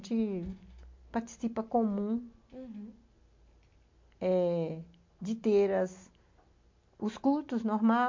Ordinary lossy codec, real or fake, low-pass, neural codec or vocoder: MP3, 48 kbps; real; 7.2 kHz; none